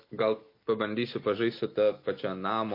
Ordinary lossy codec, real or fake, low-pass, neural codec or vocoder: MP3, 32 kbps; real; 5.4 kHz; none